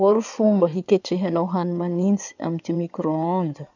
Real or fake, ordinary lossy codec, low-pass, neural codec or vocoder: fake; none; 7.2 kHz; codec, 16 kHz in and 24 kHz out, 2.2 kbps, FireRedTTS-2 codec